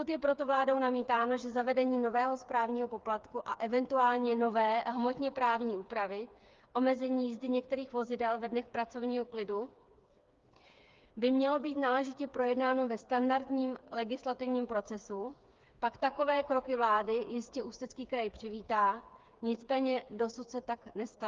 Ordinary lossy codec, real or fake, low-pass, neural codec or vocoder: Opus, 32 kbps; fake; 7.2 kHz; codec, 16 kHz, 4 kbps, FreqCodec, smaller model